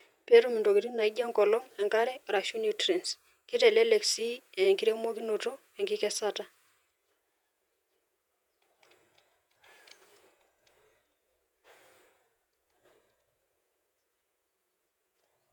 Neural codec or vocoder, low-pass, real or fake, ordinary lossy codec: vocoder, 48 kHz, 128 mel bands, Vocos; 19.8 kHz; fake; none